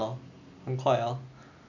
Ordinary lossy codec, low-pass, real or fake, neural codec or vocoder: none; 7.2 kHz; real; none